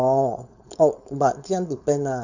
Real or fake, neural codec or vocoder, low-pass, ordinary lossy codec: fake; codec, 16 kHz, 4.8 kbps, FACodec; 7.2 kHz; none